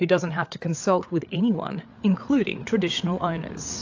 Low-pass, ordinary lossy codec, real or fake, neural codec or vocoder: 7.2 kHz; AAC, 48 kbps; fake; codec, 16 kHz, 8 kbps, FreqCodec, larger model